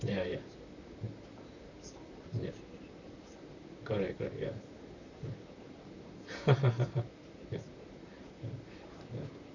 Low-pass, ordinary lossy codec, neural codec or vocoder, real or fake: 7.2 kHz; none; vocoder, 44.1 kHz, 128 mel bands, Pupu-Vocoder; fake